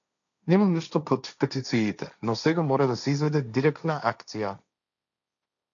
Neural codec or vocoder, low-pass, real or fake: codec, 16 kHz, 1.1 kbps, Voila-Tokenizer; 7.2 kHz; fake